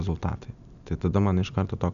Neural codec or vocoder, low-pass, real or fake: none; 7.2 kHz; real